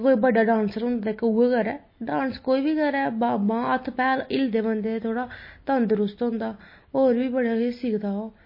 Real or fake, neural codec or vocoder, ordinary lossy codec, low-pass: real; none; MP3, 24 kbps; 5.4 kHz